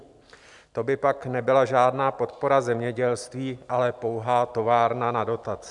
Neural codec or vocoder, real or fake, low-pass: none; real; 10.8 kHz